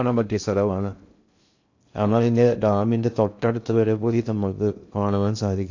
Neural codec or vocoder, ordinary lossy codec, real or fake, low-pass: codec, 16 kHz in and 24 kHz out, 0.6 kbps, FocalCodec, streaming, 2048 codes; AAC, 48 kbps; fake; 7.2 kHz